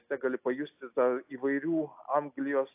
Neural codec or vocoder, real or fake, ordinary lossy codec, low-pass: none; real; AAC, 32 kbps; 3.6 kHz